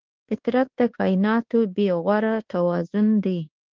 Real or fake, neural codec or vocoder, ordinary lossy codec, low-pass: fake; codec, 24 kHz, 1.2 kbps, DualCodec; Opus, 16 kbps; 7.2 kHz